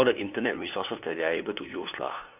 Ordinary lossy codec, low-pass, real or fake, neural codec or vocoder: none; 3.6 kHz; fake; codec, 16 kHz, 2 kbps, FunCodec, trained on LibriTTS, 25 frames a second